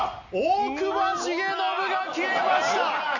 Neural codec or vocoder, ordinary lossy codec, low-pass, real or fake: none; none; 7.2 kHz; real